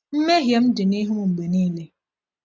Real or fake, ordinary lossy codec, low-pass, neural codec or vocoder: real; none; none; none